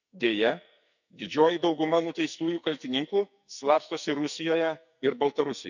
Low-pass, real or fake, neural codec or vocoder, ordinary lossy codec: 7.2 kHz; fake; codec, 44.1 kHz, 2.6 kbps, SNAC; none